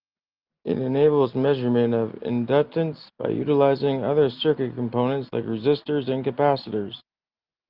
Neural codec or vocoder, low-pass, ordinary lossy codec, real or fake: none; 5.4 kHz; Opus, 32 kbps; real